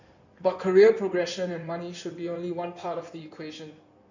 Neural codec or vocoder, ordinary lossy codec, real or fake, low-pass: codec, 16 kHz in and 24 kHz out, 2.2 kbps, FireRedTTS-2 codec; none; fake; 7.2 kHz